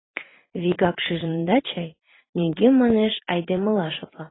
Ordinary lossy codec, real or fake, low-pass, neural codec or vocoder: AAC, 16 kbps; fake; 7.2 kHz; vocoder, 44.1 kHz, 80 mel bands, Vocos